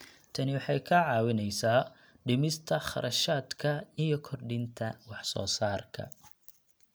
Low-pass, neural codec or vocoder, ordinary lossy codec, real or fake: none; none; none; real